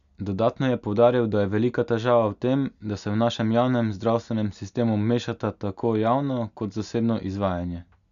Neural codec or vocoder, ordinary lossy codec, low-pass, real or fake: none; none; 7.2 kHz; real